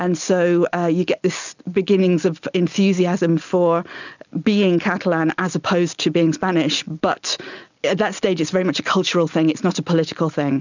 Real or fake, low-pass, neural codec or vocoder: real; 7.2 kHz; none